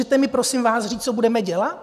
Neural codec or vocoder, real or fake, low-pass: none; real; 14.4 kHz